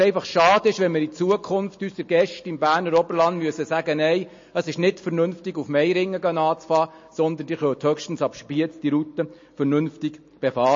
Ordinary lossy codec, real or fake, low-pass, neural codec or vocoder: MP3, 32 kbps; real; 7.2 kHz; none